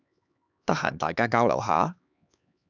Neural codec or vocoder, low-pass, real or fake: codec, 16 kHz, 4 kbps, X-Codec, HuBERT features, trained on LibriSpeech; 7.2 kHz; fake